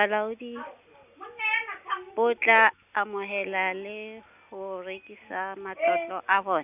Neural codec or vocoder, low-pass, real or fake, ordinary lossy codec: none; 3.6 kHz; real; none